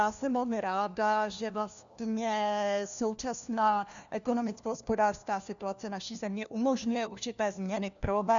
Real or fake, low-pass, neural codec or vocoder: fake; 7.2 kHz; codec, 16 kHz, 1 kbps, FunCodec, trained on LibriTTS, 50 frames a second